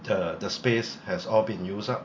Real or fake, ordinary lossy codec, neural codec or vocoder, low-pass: real; MP3, 48 kbps; none; 7.2 kHz